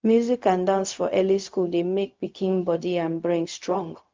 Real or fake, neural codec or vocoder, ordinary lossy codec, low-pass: fake; codec, 16 kHz, 0.4 kbps, LongCat-Audio-Codec; Opus, 32 kbps; 7.2 kHz